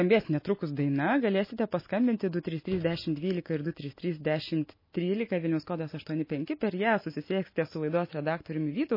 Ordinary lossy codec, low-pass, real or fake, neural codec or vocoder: MP3, 24 kbps; 5.4 kHz; real; none